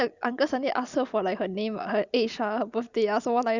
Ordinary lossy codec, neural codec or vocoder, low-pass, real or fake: Opus, 64 kbps; none; 7.2 kHz; real